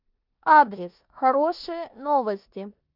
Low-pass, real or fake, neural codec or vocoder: 5.4 kHz; fake; codec, 16 kHz in and 24 kHz out, 0.9 kbps, LongCat-Audio-Codec, four codebook decoder